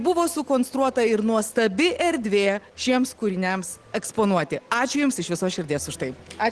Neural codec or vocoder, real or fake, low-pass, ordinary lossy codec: none; real; 10.8 kHz; Opus, 16 kbps